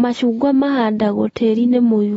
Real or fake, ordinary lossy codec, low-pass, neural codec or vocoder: real; AAC, 24 kbps; 19.8 kHz; none